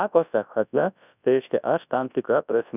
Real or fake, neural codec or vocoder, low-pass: fake; codec, 24 kHz, 0.9 kbps, WavTokenizer, large speech release; 3.6 kHz